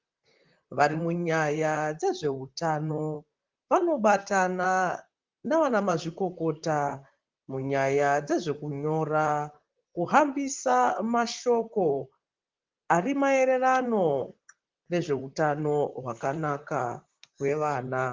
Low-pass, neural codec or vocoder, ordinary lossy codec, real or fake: 7.2 kHz; vocoder, 44.1 kHz, 128 mel bands, Pupu-Vocoder; Opus, 32 kbps; fake